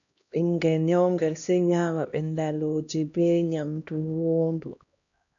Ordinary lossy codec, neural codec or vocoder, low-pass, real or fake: MP3, 96 kbps; codec, 16 kHz, 1 kbps, X-Codec, HuBERT features, trained on LibriSpeech; 7.2 kHz; fake